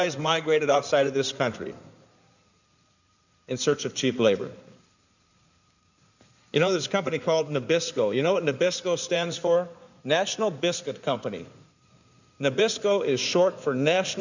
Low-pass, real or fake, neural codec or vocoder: 7.2 kHz; fake; codec, 16 kHz in and 24 kHz out, 2.2 kbps, FireRedTTS-2 codec